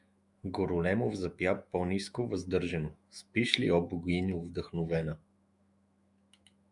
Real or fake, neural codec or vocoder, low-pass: fake; autoencoder, 48 kHz, 128 numbers a frame, DAC-VAE, trained on Japanese speech; 10.8 kHz